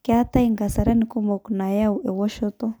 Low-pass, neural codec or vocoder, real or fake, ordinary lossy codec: none; none; real; none